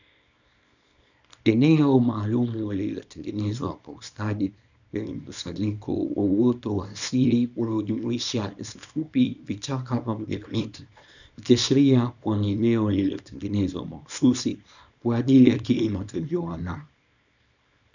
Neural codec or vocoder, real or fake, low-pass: codec, 24 kHz, 0.9 kbps, WavTokenizer, small release; fake; 7.2 kHz